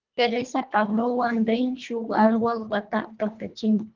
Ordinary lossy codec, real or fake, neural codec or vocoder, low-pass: Opus, 32 kbps; fake; codec, 24 kHz, 1.5 kbps, HILCodec; 7.2 kHz